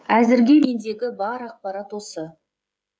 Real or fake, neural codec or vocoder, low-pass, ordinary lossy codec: fake; codec, 16 kHz, 16 kbps, FreqCodec, smaller model; none; none